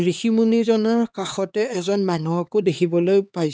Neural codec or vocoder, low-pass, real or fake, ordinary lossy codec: codec, 16 kHz, 2 kbps, X-Codec, HuBERT features, trained on LibriSpeech; none; fake; none